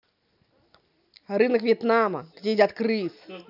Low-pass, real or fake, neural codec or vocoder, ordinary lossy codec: 5.4 kHz; real; none; none